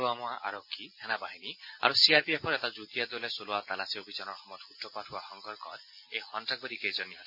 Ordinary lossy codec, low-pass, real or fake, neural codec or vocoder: none; 5.4 kHz; real; none